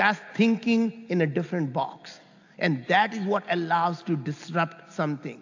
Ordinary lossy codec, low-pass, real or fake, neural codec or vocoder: AAC, 48 kbps; 7.2 kHz; real; none